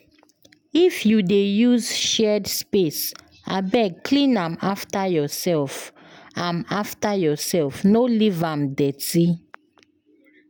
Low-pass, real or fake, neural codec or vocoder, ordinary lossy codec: none; real; none; none